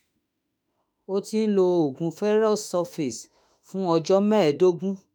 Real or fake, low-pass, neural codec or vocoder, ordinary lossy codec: fake; none; autoencoder, 48 kHz, 32 numbers a frame, DAC-VAE, trained on Japanese speech; none